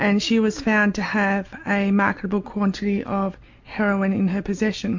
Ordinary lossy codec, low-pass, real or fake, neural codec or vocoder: MP3, 48 kbps; 7.2 kHz; real; none